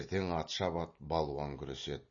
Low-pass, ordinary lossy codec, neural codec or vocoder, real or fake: 7.2 kHz; MP3, 32 kbps; none; real